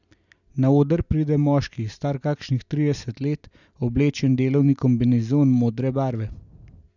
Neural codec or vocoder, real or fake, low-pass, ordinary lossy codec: none; real; 7.2 kHz; none